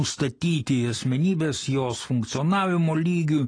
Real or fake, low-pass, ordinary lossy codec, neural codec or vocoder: real; 9.9 kHz; AAC, 32 kbps; none